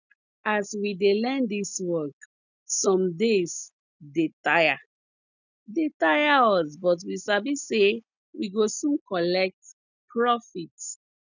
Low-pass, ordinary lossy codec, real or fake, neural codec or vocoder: 7.2 kHz; none; real; none